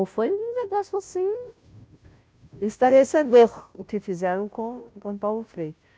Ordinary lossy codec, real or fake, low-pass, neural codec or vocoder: none; fake; none; codec, 16 kHz, 0.5 kbps, FunCodec, trained on Chinese and English, 25 frames a second